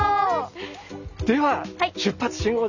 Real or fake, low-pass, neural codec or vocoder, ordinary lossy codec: real; 7.2 kHz; none; none